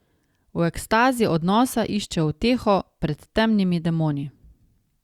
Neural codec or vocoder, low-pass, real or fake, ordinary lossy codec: none; 19.8 kHz; real; Opus, 64 kbps